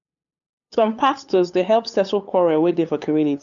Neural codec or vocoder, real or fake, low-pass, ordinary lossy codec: codec, 16 kHz, 8 kbps, FunCodec, trained on LibriTTS, 25 frames a second; fake; 7.2 kHz; MP3, 96 kbps